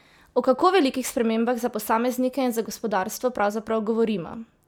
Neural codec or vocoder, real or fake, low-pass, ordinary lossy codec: none; real; none; none